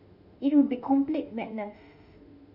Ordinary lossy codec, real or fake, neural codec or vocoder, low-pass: none; fake; autoencoder, 48 kHz, 32 numbers a frame, DAC-VAE, trained on Japanese speech; 5.4 kHz